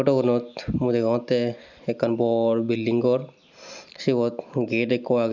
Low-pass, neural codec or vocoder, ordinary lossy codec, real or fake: 7.2 kHz; none; none; real